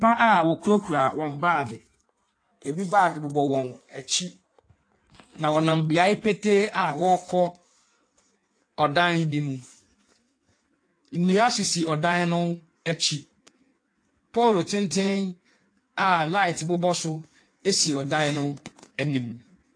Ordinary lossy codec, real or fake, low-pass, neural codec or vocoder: AAC, 48 kbps; fake; 9.9 kHz; codec, 16 kHz in and 24 kHz out, 1.1 kbps, FireRedTTS-2 codec